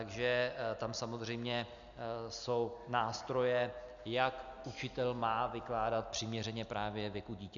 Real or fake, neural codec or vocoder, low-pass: real; none; 7.2 kHz